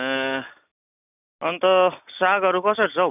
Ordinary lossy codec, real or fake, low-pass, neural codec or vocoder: none; real; 3.6 kHz; none